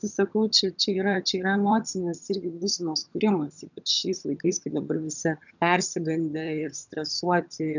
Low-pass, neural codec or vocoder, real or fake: 7.2 kHz; vocoder, 22.05 kHz, 80 mel bands, HiFi-GAN; fake